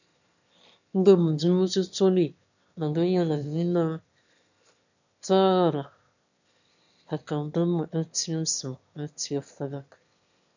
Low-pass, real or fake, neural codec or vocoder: 7.2 kHz; fake; autoencoder, 22.05 kHz, a latent of 192 numbers a frame, VITS, trained on one speaker